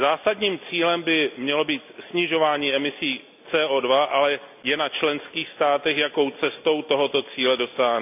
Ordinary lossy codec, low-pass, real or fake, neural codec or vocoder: none; 3.6 kHz; real; none